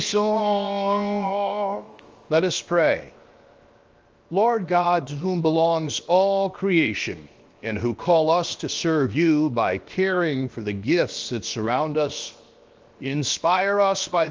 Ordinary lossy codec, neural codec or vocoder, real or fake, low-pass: Opus, 32 kbps; codec, 16 kHz, 0.7 kbps, FocalCodec; fake; 7.2 kHz